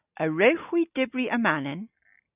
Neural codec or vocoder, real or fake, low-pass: none; real; 3.6 kHz